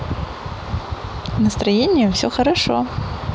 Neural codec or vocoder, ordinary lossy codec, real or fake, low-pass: none; none; real; none